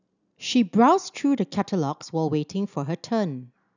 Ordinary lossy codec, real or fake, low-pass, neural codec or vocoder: none; real; 7.2 kHz; none